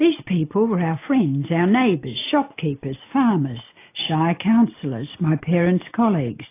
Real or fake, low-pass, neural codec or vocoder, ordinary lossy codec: real; 3.6 kHz; none; AAC, 24 kbps